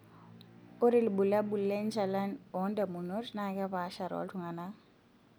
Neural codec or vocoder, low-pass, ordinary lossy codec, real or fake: none; none; none; real